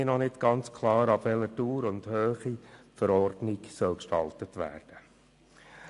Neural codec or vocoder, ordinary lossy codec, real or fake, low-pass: none; AAC, 64 kbps; real; 10.8 kHz